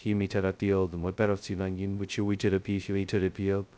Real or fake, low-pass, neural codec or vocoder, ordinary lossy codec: fake; none; codec, 16 kHz, 0.2 kbps, FocalCodec; none